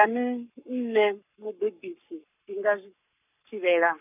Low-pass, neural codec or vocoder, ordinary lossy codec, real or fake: 3.6 kHz; codec, 44.1 kHz, 7.8 kbps, Pupu-Codec; none; fake